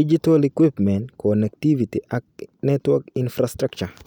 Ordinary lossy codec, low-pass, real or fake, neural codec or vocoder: none; 19.8 kHz; real; none